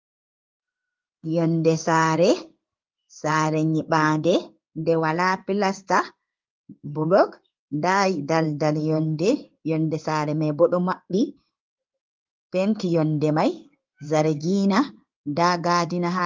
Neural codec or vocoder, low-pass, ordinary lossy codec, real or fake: codec, 16 kHz in and 24 kHz out, 1 kbps, XY-Tokenizer; 7.2 kHz; Opus, 32 kbps; fake